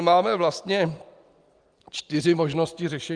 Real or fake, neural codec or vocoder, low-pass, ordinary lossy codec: real; none; 9.9 kHz; Opus, 32 kbps